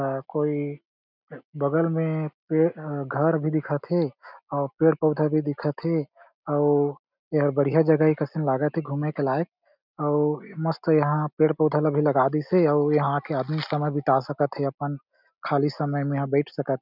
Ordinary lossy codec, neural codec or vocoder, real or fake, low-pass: none; none; real; 5.4 kHz